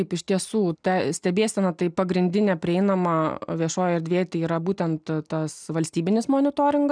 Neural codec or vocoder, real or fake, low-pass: none; real; 9.9 kHz